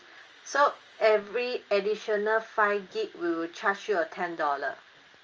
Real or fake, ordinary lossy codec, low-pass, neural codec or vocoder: real; Opus, 24 kbps; 7.2 kHz; none